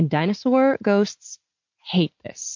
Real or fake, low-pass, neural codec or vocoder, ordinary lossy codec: real; 7.2 kHz; none; MP3, 48 kbps